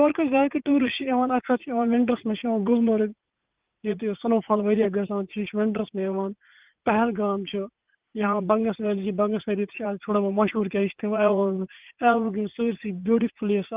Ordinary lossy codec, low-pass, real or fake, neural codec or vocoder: Opus, 32 kbps; 3.6 kHz; fake; vocoder, 44.1 kHz, 80 mel bands, Vocos